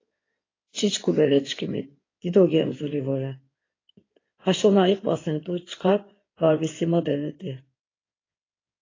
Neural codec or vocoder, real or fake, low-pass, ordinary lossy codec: codec, 16 kHz in and 24 kHz out, 2.2 kbps, FireRedTTS-2 codec; fake; 7.2 kHz; AAC, 32 kbps